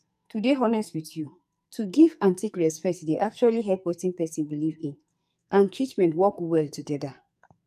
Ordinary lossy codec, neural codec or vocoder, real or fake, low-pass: none; codec, 44.1 kHz, 2.6 kbps, SNAC; fake; 14.4 kHz